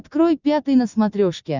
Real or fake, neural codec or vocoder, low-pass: real; none; 7.2 kHz